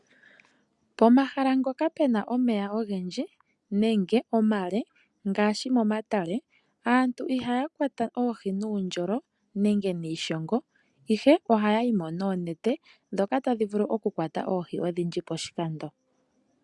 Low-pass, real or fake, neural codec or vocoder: 10.8 kHz; real; none